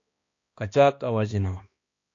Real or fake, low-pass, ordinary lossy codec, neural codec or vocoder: fake; 7.2 kHz; AAC, 48 kbps; codec, 16 kHz, 1 kbps, X-Codec, HuBERT features, trained on balanced general audio